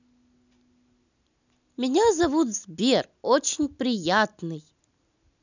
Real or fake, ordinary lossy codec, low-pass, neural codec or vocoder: real; none; 7.2 kHz; none